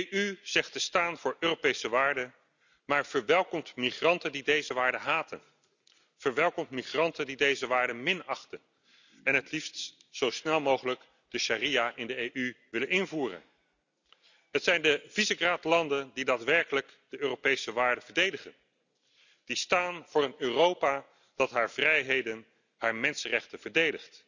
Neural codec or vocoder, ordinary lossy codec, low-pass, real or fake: none; none; 7.2 kHz; real